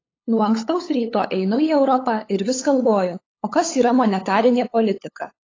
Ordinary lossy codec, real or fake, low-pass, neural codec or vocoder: AAC, 32 kbps; fake; 7.2 kHz; codec, 16 kHz, 8 kbps, FunCodec, trained on LibriTTS, 25 frames a second